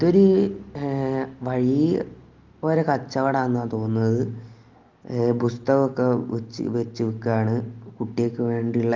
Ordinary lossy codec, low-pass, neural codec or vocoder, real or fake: Opus, 32 kbps; 7.2 kHz; none; real